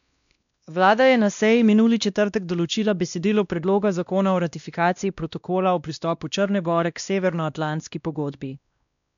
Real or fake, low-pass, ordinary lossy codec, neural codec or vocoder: fake; 7.2 kHz; none; codec, 16 kHz, 1 kbps, X-Codec, WavLM features, trained on Multilingual LibriSpeech